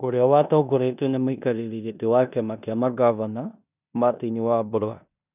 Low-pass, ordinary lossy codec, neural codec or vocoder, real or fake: 3.6 kHz; none; codec, 16 kHz in and 24 kHz out, 0.9 kbps, LongCat-Audio-Codec, four codebook decoder; fake